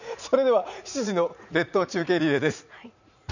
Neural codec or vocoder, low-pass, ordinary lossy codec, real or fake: vocoder, 44.1 kHz, 80 mel bands, Vocos; 7.2 kHz; none; fake